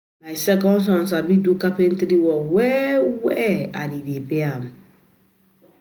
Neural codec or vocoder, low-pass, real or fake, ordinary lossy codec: none; none; real; none